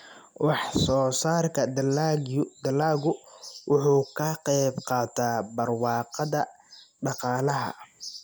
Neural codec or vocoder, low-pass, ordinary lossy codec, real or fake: none; none; none; real